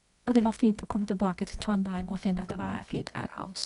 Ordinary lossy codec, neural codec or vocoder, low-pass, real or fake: none; codec, 24 kHz, 0.9 kbps, WavTokenizer, medium music audio release; 10.8 kHz; fake